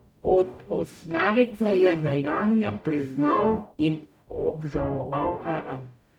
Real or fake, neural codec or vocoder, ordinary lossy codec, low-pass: fake; codec, 44.1 kHz, 0.9 kbps, DAC; none; 19.8 kHz